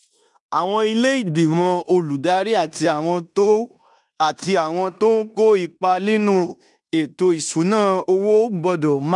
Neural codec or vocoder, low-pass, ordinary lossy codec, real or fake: codec, 16 kHz in and 24 kHz out, 0.9 kbps, LongCat-Audio-Codec, fine tuned four codebook decoder; 10.8 kHz; none; fake